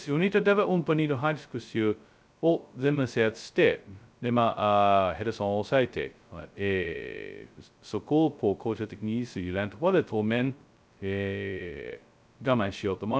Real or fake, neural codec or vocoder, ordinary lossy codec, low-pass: fake; codec, 16 kHz, 0.2 kbps, FocalCodec; none; none